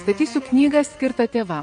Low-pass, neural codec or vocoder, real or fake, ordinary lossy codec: 9.9 kHz; vocoder, 22.05 kHz, 80 mel bands, WaveNeXt; fake; MP3, 48 kbps